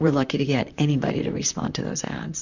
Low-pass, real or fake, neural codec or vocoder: 7.2 kHz; fake; vocoder, 44.1 kHz, 128 mel bands, Pupu-Vocoder